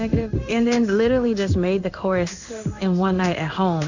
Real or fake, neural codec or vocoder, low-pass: fake; codec, 16 kHz in and 24 kHz out, 1 kbps, XY-Tokenizer; 7.2 kHz